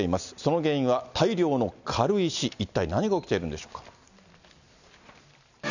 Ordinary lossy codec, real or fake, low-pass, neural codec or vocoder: none; real; 7.2 kHz; none